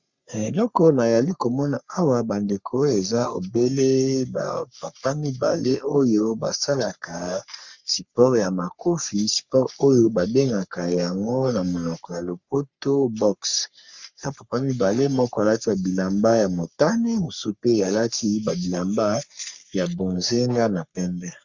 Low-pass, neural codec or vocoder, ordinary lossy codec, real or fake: 7.2 kHz; codec, 44.1 kHz, 3.4 kbps, Pupu-Codec; Opus, 64 kbps; fake